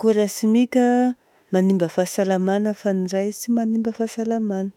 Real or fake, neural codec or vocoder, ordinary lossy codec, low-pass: fake; autoencoder, 48 kHz, 32 numbers a frame, DAC-VAE, trained on Japanese speech; none; 19.8 kHz